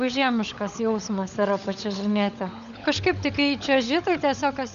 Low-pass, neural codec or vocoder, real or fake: 7.2 kHz; codec, 16 kHz, 16 kbps, FunCodec, trained on LibriTTS, 50 frames a second; fake